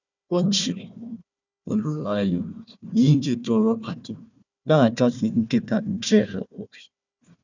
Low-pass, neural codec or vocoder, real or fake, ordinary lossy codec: 7.2 kHz; codec, 16 kHz, 1 kbps, FunCodec, trained on Chinese and English, 50 frames a second; fake; none